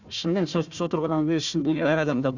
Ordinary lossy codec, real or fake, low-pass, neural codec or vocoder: none; fake; 7.2 kHz; codec, 16 kHz, 1 kbps, FunCodec, trained on Chinese and English, 50 frames a second